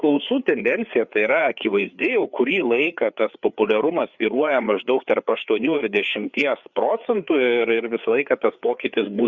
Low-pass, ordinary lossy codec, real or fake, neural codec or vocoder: 7.2 kHz; Opus, 64 kbps; fake; codec, 16 kHz, 4 kbps, FreqCodec, larger model